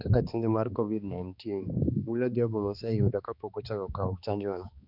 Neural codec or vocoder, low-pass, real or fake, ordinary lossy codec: codec, 16 kHz, 2 kbps, X-Codec, HuBERT features, trained on balanced general audio; 5.4 kHz; fake; none